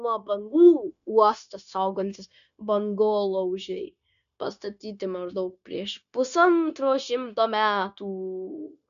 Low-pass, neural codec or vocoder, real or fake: 7.2 kHz; codec, 16 kHz, 0.9 kbps, LongCat-Audio-Codec; fake